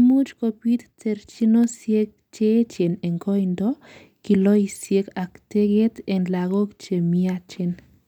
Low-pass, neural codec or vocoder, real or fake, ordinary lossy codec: 19.8 kHz; none; real; none